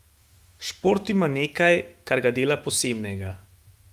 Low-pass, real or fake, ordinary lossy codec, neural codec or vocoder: 14.4 kHz; fake; Opus, 32 kbps; autoencoder, 48 kHz, 128 numbers a frame, DAC-VAE, trained on Japanese speech